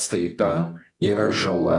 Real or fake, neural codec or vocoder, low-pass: fake; codec, 24 kHz, 0.9 kbps, WavTokenizer, medium music audio release; 10.8 kHz